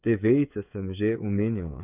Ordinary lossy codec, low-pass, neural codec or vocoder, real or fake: none; 3.6 kHz; codec, 16 kHz, 8 kbps, FreqCodec, smaller model; fake